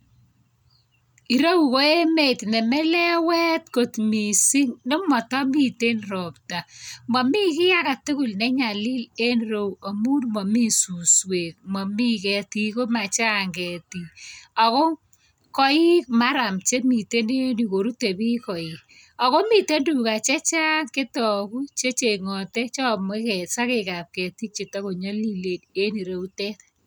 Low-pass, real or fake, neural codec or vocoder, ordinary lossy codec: none; real; none; none